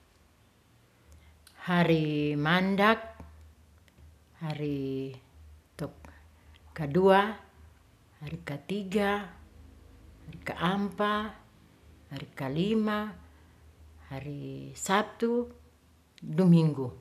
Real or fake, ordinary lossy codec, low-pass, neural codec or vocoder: real; none; 14.4 kHz; none